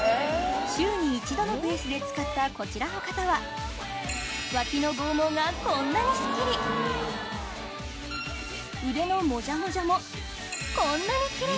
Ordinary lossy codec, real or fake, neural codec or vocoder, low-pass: none; real; none; none